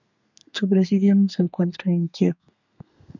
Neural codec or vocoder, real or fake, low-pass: codec, 32 kHz, 1.9 kbps, SNAC; fake; 7.2 kHz